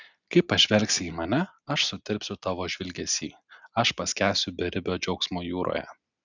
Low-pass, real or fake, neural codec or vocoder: 7.2 kHz; real; none